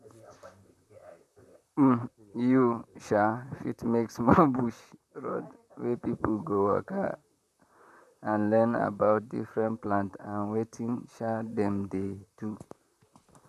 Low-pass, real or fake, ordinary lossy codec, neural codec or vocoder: 14.4 kHz; fake; none; vocoder, 44.1 kHz, 128 mel bands, Pupu-Vocoder